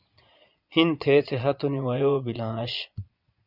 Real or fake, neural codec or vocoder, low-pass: fake; vocoder, 22.05 kHz, 80 mel bands, Vocos; 5.4 kHz